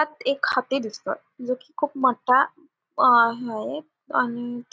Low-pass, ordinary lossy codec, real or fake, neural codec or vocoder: none; none; real; none